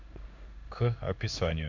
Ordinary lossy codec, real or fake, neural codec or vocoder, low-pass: none; fake; codec, 16 kHz in and 24 kHz out, 1 kbps, XY-Tokenizer; 7.2 kHz